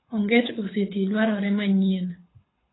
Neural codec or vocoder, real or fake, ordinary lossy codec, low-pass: codec, 24 kHz, 6 kbps, HILCodec; fake; AAC, 16 kbps; 7.2 kHz